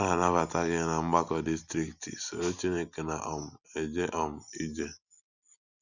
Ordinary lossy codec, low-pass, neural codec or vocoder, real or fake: none; 7.2 kHz; none; real